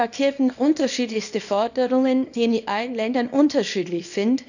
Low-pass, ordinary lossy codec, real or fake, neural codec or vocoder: 7.2 kHz; none; fake; codec, 24 kHz, 0.9 kbps, WavTokenizer, small release